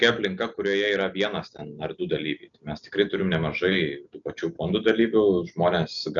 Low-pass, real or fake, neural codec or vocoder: 7.2 kHz; real; none